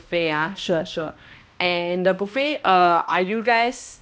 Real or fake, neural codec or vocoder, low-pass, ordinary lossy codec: fake; codec, 16 kHz, 1 kbps, X-Codec, HuBERT features, trained on balanced general audio; none; none